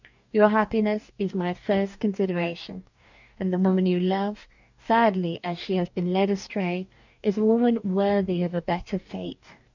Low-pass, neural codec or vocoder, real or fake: 7.2 kHz; codec, 44.1 kHz, 2.6 kbps, DAC; fake